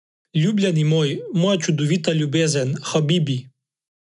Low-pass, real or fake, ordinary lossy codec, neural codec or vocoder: 10.8 kHz; real; none; none